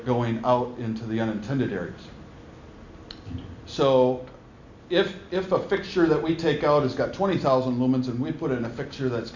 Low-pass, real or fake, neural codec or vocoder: 7.2 kHz; real; none